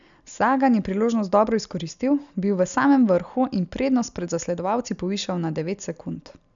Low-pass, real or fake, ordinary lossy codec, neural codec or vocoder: 7.2 kHz; real; none; none